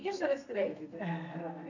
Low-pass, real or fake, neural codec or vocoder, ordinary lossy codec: 7.2 kHz; fake; codec, 32 kHz, 1.9 kbps, SNAC; none